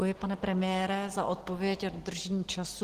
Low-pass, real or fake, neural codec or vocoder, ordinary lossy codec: 14.4 kHz; real; none; Opus, 16 kbps